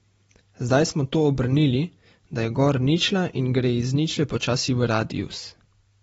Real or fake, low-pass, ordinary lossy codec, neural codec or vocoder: real; 19.8 kHz; AAC, 24 kbps; none